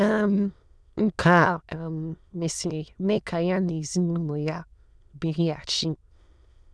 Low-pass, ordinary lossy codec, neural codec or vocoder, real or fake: none; none; autoencoder, 22.05 kHz, a latent of 192 numbers a frame, VITS, trained on many speakers; fake